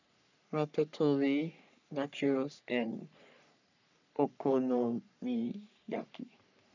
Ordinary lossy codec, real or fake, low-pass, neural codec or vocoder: none; fake; 7.2 kHz; codec, 44.1 kHz, 3.4 kbps, Pupu-Codec